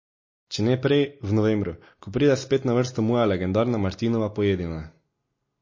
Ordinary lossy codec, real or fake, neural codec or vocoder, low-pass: MP3, 32 kbps; real; none; 7.2 kHz